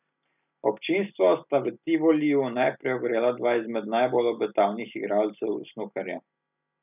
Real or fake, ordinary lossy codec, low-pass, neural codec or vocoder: real; none; 3.6 kHz; none